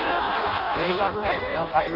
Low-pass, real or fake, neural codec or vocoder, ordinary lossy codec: 5.4 kHz; fake; codec, 16 kHz in and 24 kHz out, 0.6 kbps, FireRedTTS-2 codec; none